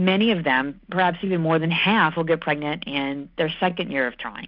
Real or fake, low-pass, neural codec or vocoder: real; 5.4 kHz; none